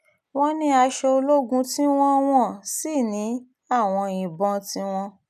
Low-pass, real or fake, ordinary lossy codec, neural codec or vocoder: 14.4 kHz; real; none; none